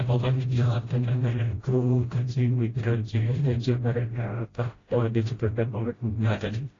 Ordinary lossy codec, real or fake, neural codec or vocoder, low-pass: AAC, 32 kbps; fake; codec, 16 kHz, 0.5 kbps, FreqCodec, smaller model; 7.2 kHz